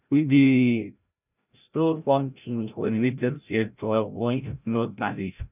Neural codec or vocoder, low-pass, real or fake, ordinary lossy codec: codec, 16 kHz, 0.5 kbps, FreqCodec, larger model; 3.6 kHz; fake; none